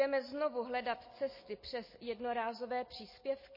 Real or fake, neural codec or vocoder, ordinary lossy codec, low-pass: real; none; MP3, 24 kbps; 5.4 kHz